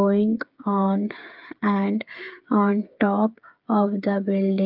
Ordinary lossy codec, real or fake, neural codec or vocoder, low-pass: Opus, 32 kbps; fake; codec, 16 kHz, 8 kbps, FreqCodec, smaller model; 5.4 kHz